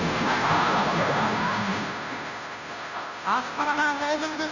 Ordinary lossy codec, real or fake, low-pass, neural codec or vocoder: none; fake; 7.2 kHz; codec, 16 kHz, 0.5 kbps, FunCodec, trained on Chinese and English, 25 frames a second